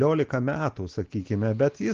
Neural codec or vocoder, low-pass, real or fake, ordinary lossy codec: none; 7.2 kHz; real; Opus, 16 kbps